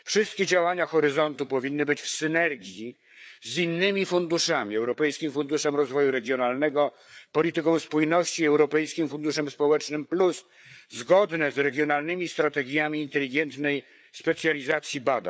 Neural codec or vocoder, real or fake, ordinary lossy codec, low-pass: codec, 16 kHz, 4 kbps, FreqCodec, larger model; fake; none; none